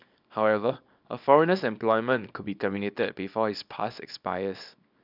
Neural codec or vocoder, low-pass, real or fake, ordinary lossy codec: codec, 24 kHz, 0.9 kbps, WavTokenizer, small release; 5.4 kHz; fake; none